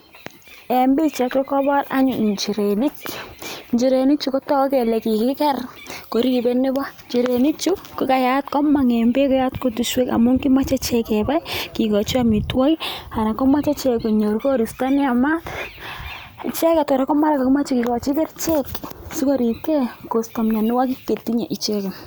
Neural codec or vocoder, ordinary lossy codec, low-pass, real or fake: none; none; none; real